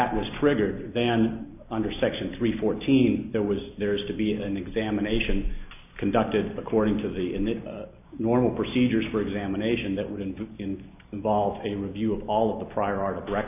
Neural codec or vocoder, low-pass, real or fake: none; 3.6 kHz; real